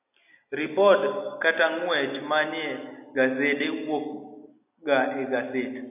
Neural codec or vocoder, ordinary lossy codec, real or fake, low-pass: none; AAC, 32 kbps; real; 3.6 kHz